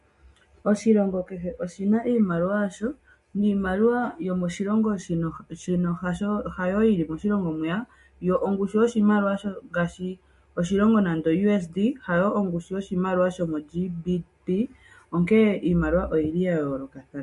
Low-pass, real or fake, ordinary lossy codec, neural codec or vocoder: 14.4 kHz; real; MP3, 48 kbps; none